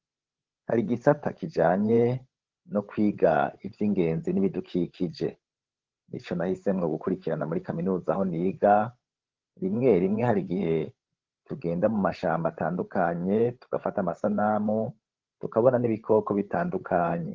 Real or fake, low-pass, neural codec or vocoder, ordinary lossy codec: fake; 7.2 kHz; codec, 16 kHz, 16 kbps, FreqCodec, larger model; Opus, 16 kbps